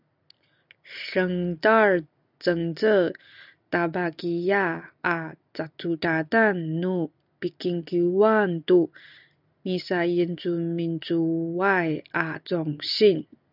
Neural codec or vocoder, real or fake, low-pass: none; real; 5.4 kHz